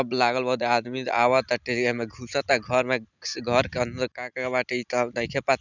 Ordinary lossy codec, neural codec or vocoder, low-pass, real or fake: none; none; 7.2 kHz; real